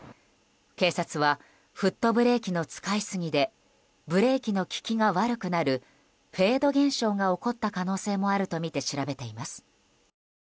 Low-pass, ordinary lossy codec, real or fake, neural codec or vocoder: none; none; real; none